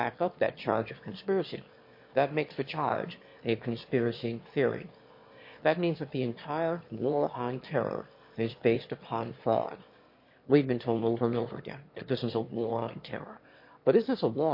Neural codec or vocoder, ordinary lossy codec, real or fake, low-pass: autoencoder, 22.05 kHz, a latent of 192 numbers a frame, VITS, trained on one speaker; MP3, 32 kbps; fake; 5.4 kHz